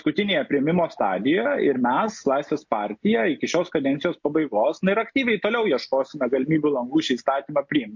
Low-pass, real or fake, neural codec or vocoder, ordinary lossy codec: 7.2 kHz; real; none; MP3, 48 kbps